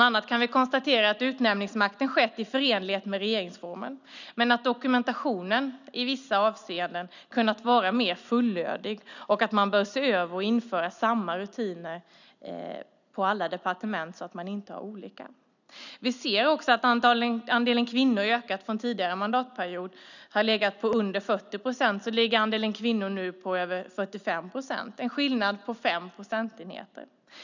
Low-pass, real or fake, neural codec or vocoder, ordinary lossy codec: 7.2 kHz; real; none; none